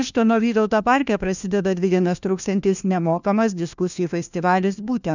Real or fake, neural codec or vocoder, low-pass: fake; codec, 16 kHz, 1 kbps, FunCodec, trained on LibriTTS, 50 frames a second; 7.2 kHz